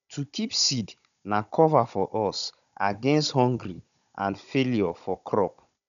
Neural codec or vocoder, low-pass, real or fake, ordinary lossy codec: codec, 16 kHz, 16 kbps, FunCodec, trained on Chinese and English, 50 frames a second; 7.2 kHz; fake; none